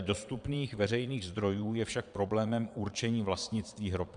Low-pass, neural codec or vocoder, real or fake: 9.9 kHz; vocoder, 22.05 kHz, 80 mel bands, Vocos; fake